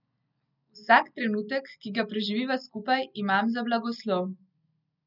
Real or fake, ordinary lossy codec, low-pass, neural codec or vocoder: real; none; 5.4 kHz; none